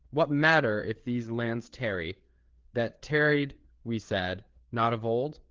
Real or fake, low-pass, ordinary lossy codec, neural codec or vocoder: fake; 7.2 kHz; Opus, 16 kbps; codec, 16 kHz, 4 kbps, FreqCodec, larger model